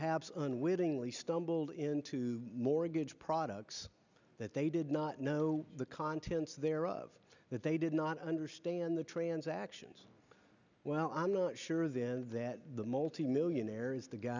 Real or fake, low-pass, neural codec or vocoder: real; 7.2 kHz; none